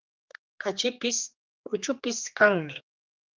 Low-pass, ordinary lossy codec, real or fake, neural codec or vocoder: 7.2 kHz; Opus, 32 kbps; fake; codec, 16 kHz, 2 kbps, X-Codec, HuBERT features, trained on general audio